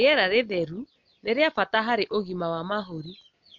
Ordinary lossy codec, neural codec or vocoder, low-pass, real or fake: MP3, 64 kbps; none; 7.2 kHz; real